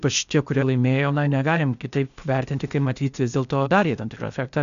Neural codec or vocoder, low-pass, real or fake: codec, 16 kHz, 0.8 kbps, ZipCodec; 7.2 kHz; fake